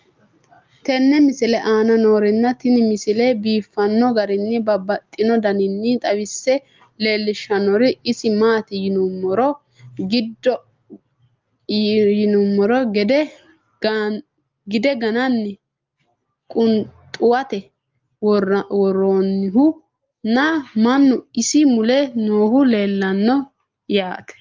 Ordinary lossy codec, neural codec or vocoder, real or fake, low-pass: Opus, 32 kbps; none; real; 7.2 kHz